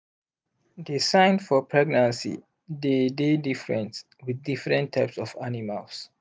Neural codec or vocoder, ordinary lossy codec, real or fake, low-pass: none; none; real; none